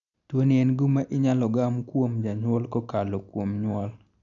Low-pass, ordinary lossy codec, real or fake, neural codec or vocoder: 7.2 kHz; none; real; none